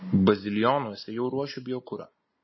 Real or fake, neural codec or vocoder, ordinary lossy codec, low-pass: real; none; MP3, 24 kbps; 7.2 kHz